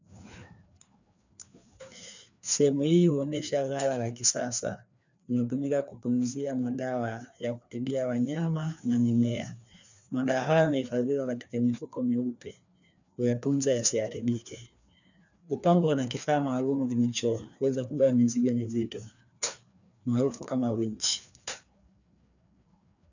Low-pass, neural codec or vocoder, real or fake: 7.2 kHz; codec, 16 kHz, 2 kbps, FreqCodec, larger model; fake